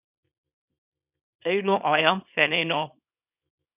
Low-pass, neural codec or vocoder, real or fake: 3.6 kHz; codec, 24 kHz, 0.9 kbps, WavTokenizer, small release; fake